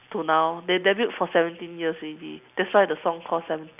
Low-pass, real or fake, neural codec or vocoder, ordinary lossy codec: 3.6 kHz; real; none; none